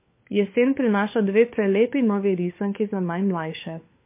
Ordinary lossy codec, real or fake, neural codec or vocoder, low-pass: MP3, 24 kbps; fake; codec, 16 kHz, 4 kbps, FunCodec, trained on LibriTTS, 50 frames a second; 3.6 kHz